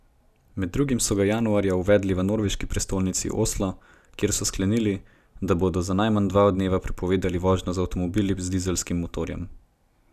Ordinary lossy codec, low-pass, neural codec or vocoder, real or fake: none; 14.4 kHz; none; real